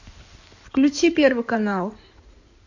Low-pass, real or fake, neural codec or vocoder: 7.2 kHz; fake; codec, 16 kHz in and 24 kHz out, 2.2 kbps, FireRedTTS-2 codec